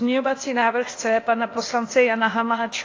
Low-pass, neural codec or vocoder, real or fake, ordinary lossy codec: 7.2 kHz; codec, 16 kHz, 0.8 kbps, ZipCodec; fake; AAC, 32 kbps